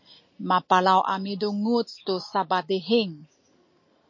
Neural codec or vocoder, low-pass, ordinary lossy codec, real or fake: none; 7.2 kHz; MP3, 32 kbps; real